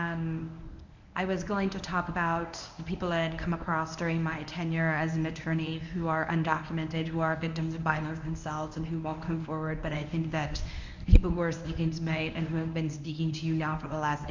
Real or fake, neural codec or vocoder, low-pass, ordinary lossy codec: fake; codec, 24 kHz, 0.9 kbps, WavTokenizer, medium speech release version 1; 7.2 kHz; MP3, 64 kbps